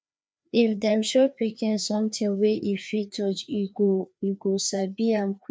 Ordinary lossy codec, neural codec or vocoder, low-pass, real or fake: none; codec, 16 kHz, 2 kbps, FreqCodec, larger model; none; fake